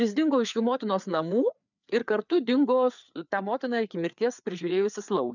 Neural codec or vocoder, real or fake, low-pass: codec, 16 kHz, 4 kbps, FreqCodec, larger model; fake; 7.2 kHz